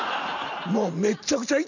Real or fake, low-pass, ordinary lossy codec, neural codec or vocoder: real; 7.2 kHz; none; none